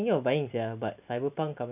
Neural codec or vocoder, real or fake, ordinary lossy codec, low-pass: none; real; none; 3.6 kHz